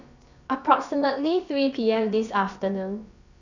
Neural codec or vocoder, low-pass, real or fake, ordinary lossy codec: codec, 16 kHz, about 1 kbps, DyCAST, with the encoder's durations; 7.2 kHz; fake; none